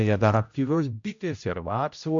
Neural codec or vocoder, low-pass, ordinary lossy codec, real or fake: codec, 16 kHz, 0.5 kbps, X-Codec, HuBERT features, trained on general audio; 7.2 kHz; MP3, 64 kbps; fake